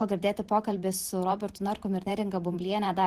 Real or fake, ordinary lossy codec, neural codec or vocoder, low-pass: fake; Opus, 16 kbps; vocoder, 44.1 kHz, 128 mel bands every 512 samples, BigVGAN v2; 14.4 kHz